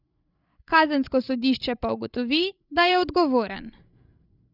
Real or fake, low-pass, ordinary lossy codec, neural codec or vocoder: fake; 5.4 kHz; none; codec, 16 kHz, 16 kbps, FreqCodec, larger model